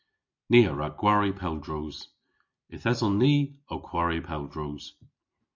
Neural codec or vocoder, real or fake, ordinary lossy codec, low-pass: none; real; MP3, 48 kbps; 7.2 kHz